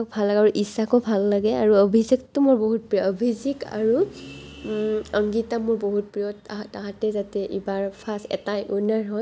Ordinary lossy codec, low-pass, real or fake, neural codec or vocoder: none; none; real; none